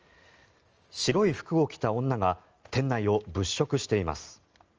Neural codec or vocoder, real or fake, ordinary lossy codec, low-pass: none; real; Opus, 24 kbps; 7.2 kHz